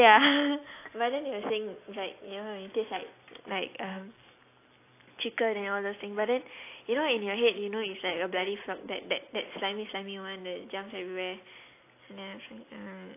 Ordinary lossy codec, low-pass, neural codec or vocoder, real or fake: AAC, 24 kbps; 3.6 kHz; none; real